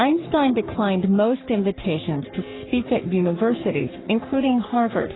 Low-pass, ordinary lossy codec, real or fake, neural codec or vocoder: 7.2 kHz; AAC, 16 kbps; fake; codec, 44.1 kHz, 3.4 kbps, Pupu-Codec